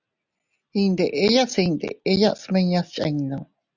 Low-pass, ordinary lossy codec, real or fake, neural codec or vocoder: 7.2 kHz; Opus, 64 kbps; real; none